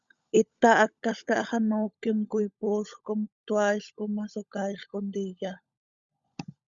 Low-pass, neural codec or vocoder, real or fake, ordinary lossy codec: 7.2 kHz; codec, 16 kHz, 16 kbps, FunCodec, trained on LibriTTS, 50 frames a second; fake; Opus, 64 kbps